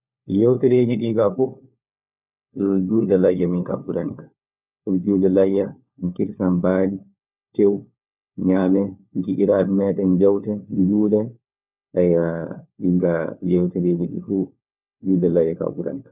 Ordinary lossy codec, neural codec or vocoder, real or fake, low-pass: none; codec, 16 kHz, 4 kbps, FunCodec, trained on LibriTTS, 50 frames a second; fake; 3.6 kHz